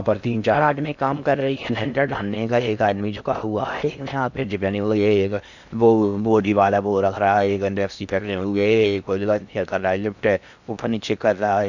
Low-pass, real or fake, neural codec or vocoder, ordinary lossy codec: 7.2 kHz; fake; codec, 16 kHz in and 24 kHz out, 0.6 kbps, FocalCodec, streaming, 2048 codes; none